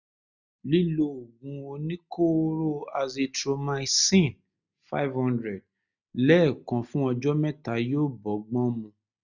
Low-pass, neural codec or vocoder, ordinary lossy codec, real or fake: 7.2 kHz; none; none; real